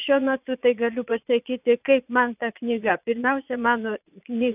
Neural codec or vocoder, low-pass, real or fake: vocoder, 22.05 kHz, 80 mel bands, Vocos; 3.6 kHz; fake